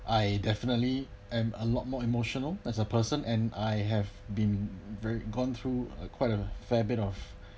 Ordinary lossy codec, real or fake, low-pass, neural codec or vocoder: none; real; none; none